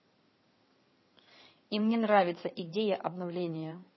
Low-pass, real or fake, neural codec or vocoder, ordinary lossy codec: 7.2 kHz; fake; codec, 16 kHz in and 24 kHz out, 2.2 kbps, FireRedTTS-2 codec; MP3, 24 kbps